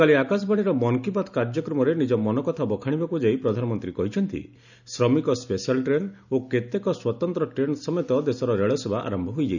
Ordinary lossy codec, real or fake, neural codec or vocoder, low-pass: none; real; none; 7.2 kHz